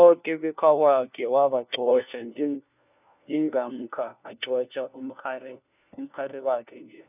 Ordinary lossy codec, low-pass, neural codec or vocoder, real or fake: none; 3.6 kHz; codec, 16 kHz, 1 kbps, FunCodec, trained on LibriTTS, 50 frames a second; fake